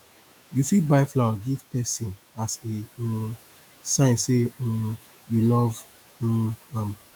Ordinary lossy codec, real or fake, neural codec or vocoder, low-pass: none; fake; autoencoder, 48 kHz, 128 numbers a frame, DAC-VAE, trained on Japanese speech; none